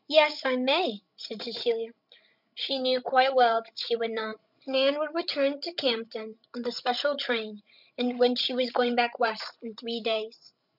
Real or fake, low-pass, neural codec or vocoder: fake; 5.4 kHz; codec, 16 kHz, 16 kbps, FreqCodec, larger model